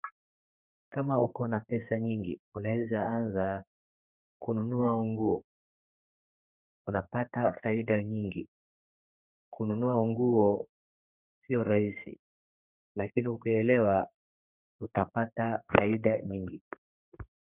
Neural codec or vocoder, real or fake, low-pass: codec, 32 kHz, 1.9 kbps, SNAC; fake; 3.6 kHz